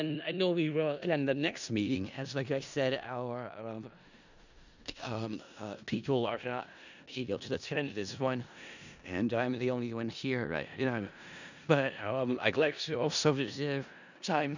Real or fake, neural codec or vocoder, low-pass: fake; codec, 16 kHz in and 24 kHz out, 0.4 kbps, LongCat-Audio-Codec, four codebook decoder; 7.2 kHz